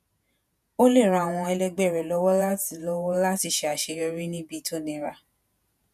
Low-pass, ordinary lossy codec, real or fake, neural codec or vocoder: 14.4 kHz; none; fake; vocoder, 48 kHz, 128 mel bands, Vocos